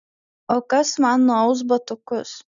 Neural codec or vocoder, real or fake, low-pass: none; real; 7.2 kHz